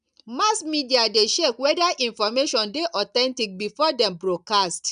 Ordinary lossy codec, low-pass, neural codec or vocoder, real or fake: none; 9.9 kHz; none; real